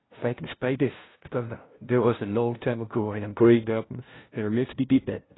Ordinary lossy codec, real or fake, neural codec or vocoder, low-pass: AAC, 16 kbps; fake; codec, 16 kHz, 0.5 kbps, FunCodec, trained on LibriTTS, 25 frames a second; 7.2 kHz